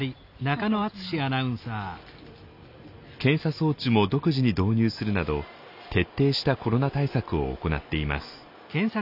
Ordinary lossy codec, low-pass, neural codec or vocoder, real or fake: none; 5.4 kHz; none; real